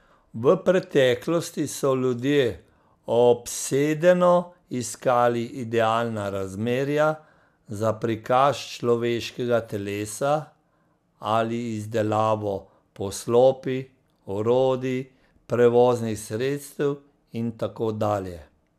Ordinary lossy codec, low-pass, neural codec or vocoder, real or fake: none; 14.4 kHz; none; real